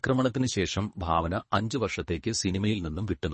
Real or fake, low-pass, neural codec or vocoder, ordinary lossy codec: fake; 10.8 kHz; codec, 24 kHz, 3 kbps, HILCodec; MP3, 32 kbps